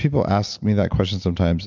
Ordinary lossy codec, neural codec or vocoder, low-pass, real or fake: MP3, 64 kbps; none; 7.2 kHz; real